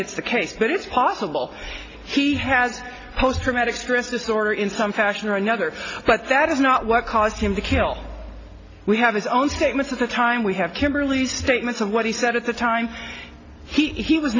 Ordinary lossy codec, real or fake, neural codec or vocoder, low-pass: AAC, 32 kbps; real; none; 7.2 kHz